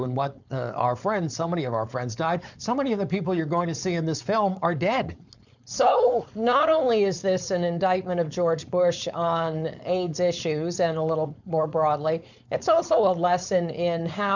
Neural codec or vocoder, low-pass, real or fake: codec, 16 kHz, 4.8 kbps, FACodec; 7.2 kHz; fake